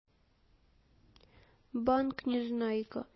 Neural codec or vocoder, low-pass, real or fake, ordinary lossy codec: none; 7.2 kHz; real; MP3, 24 kbps